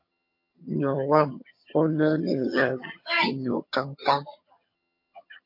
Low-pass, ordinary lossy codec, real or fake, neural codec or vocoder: 5.4 kHz; MP3, 32 kbps; fake; vocoder, 22.05 kHz, 80 mel bands, HiFi-GAN